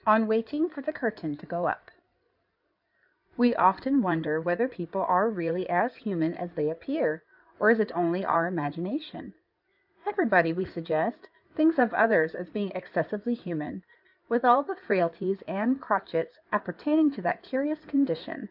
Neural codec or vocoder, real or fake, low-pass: codec, 16 kHz in and 24 kHz out, 2.2 kbps, FireRedTTS-2 codec; fake; 5.4 kHz